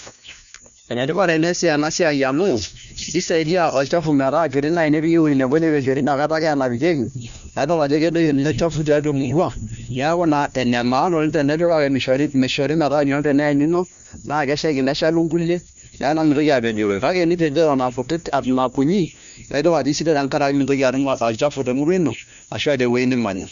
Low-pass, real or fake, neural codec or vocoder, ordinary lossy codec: 7.2 kHz; fake; codec, 16 kHz, 1 kbps, FunCodec, trained on LibriTTS, 50 frames a second; none